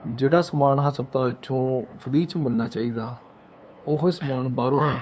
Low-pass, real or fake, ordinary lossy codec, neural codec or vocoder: none; fake; none; codec, 16 kHz, 2 kbps, FunCodec, trained on LibriTTS, 25 frames a second